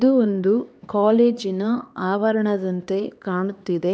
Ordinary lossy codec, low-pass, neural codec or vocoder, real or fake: none; none; codec, 16 kHz, 2 kbps, X-Codec, HuBERT features, trained on LibriSpeech; fake